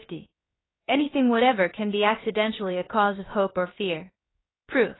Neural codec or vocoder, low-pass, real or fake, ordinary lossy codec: codec, 16 kHz, about 1 kbps, DyCAST, with the encoder's durations; 7.2 kHz; fake; AAC, 16 kbps